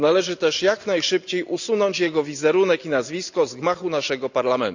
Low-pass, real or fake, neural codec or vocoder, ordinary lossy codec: 7.2 kHz; real; none; none